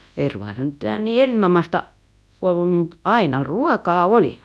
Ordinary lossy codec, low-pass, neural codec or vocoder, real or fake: none; none; codec, 24 kHz, 0.9 kbps, WavTokenizer, large speech release; fake